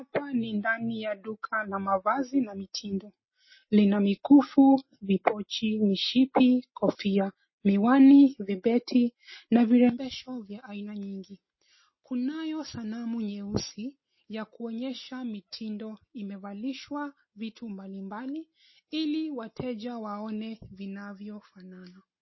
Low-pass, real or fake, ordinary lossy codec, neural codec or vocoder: 7.2 kHz; real; MP3, 24 kbps; none